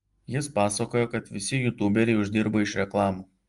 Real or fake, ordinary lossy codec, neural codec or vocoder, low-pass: real; Opus, 32 kbps; none; 10.8 kHz